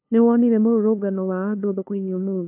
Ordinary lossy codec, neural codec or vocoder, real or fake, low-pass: AAC, 32 kbps; codec, 16 kHz, 2 kbps, FunCodec, trained on LibriTTS, 25 frames a second; fake; 3.6 kHz